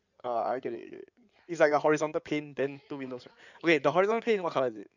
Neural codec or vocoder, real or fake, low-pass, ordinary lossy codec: codec, 16 kHz in and 24 kHz out, 2.2 kbps, FireRedTTS-2 codec; fake; 7.2 kHz; none